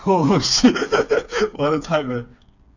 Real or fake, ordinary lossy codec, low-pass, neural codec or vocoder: fake; none; 7.2 kHz; codec, 16 kHz, 4 kbps, FreqCodec, smaller model